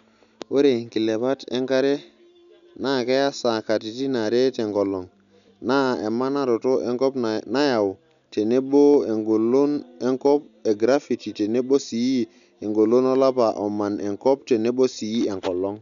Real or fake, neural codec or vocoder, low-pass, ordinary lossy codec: real; none; 7.2 kHz; none